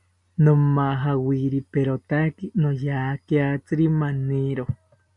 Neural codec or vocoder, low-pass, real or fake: none; 10.8 kHz; real